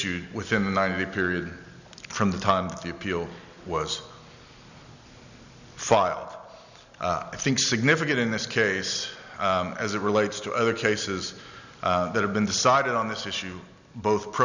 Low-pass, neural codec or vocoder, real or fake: 7.2 kHz; none; real